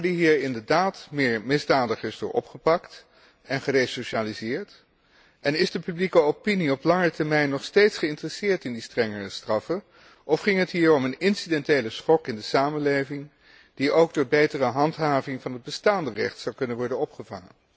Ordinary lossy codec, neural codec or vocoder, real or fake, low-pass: none; none; real; none